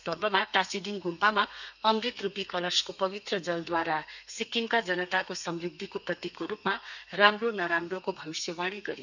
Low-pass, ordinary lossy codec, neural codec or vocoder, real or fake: 7.2 kHz; none; codec, 32 kHz, 1.9 kbps, SNAC; fake